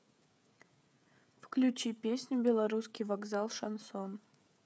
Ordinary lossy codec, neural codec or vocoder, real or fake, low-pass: none; codec, 16 kHz, 16 kbps, FreqCodec, smaller model; fake; none